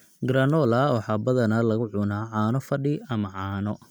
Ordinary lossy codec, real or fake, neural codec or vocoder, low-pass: none; real; none; none